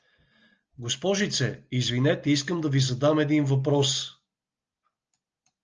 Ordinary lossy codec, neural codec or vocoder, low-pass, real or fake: Opus, 24 kbps; none; 7.2 kHz; real